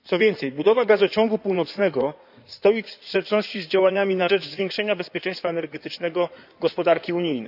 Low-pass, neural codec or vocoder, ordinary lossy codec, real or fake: 5.4 kHz; codec, 16 kHz in and 24 kHz out, 2.2 kbps, FireRedTTS-2 codec; none; fake